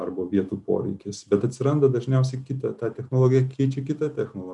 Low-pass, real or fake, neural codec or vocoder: 10.8 kHz; real; none